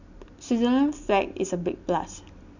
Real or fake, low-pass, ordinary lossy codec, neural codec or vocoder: real; 7.2 kHz; none; none